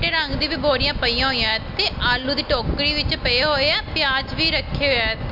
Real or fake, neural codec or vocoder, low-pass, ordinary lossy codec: real; none; 5.4 kHz; MP3, 48 kbps